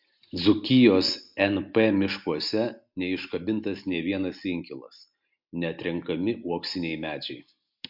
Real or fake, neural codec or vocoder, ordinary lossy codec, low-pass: real; none; MP3, 48 kbps; 5.4 kHz